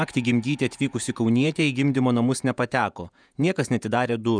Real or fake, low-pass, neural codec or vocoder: fake; 9.9 kHz; vocoder, 48 kHz, 128 mel bands, Vocos